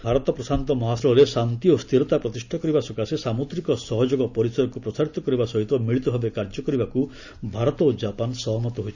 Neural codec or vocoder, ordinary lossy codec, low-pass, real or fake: none; none; 7.2 kHz; real